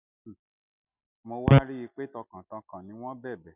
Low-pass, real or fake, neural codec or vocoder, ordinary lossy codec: 3.6 kHz; real; none; none